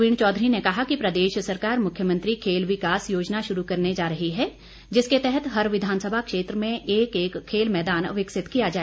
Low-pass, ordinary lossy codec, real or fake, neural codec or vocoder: none; none; real; none